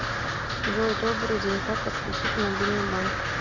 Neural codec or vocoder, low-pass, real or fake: none; 7.2 kHz; real